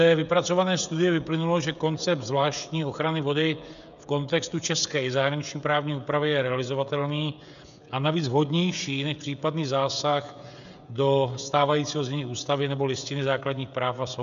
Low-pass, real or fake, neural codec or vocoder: 7.2 kHz; fake; codec, 16 kHz, 16 kbps, FreqCodec, smaller model